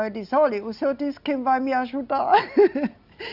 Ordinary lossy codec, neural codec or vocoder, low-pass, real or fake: Opus, 64 kbps; none; 5.4 kHz; real